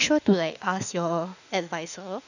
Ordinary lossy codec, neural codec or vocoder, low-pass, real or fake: none; autoencoder, 48 kHz, 32 numbers a frame, DAC-VAE, trained on Japanese speech; 7.2 kHz; fake